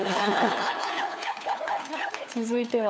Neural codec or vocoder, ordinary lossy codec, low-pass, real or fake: codec, 16 kHz, 4 kbps, FunCodec, trained on LibriTTS, 50 frames a second; none; none; fake